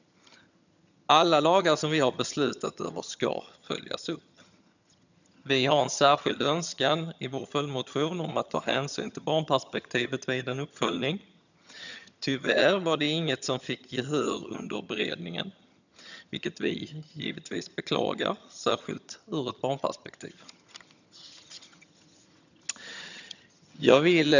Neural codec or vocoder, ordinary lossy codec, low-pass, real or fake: vocoder, 22.05 kHz, 80 mel bands, HiFi-GAN; none; 7.2 kHz; fake